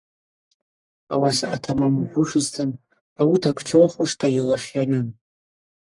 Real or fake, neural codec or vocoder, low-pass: fake; codec, 44.1 kHz, 1.7 kbps, Pupu-Codec; 10.8 kHz